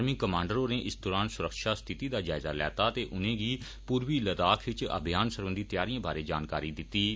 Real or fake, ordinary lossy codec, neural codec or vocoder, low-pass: real; none; none; none